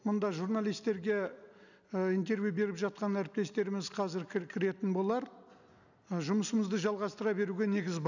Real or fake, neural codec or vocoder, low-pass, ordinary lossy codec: real; none; 7.2 kHz; none